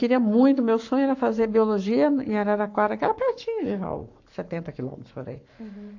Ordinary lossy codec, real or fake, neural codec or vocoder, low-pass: AAC, 48 kbps; fake; codec, 44.1 kHz, 7.8 kbps, Pupu-Codec; 7.2 kHz